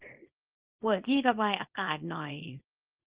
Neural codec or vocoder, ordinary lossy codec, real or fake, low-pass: codec, 24 kHz, 0.9 kbps, WavTokenizer, small release; Opus, 32 kbps; fake; 3.6 kHz